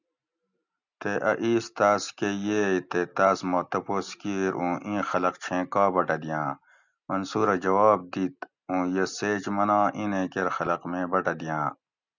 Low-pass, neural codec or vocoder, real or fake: 7.2 kHz; none; real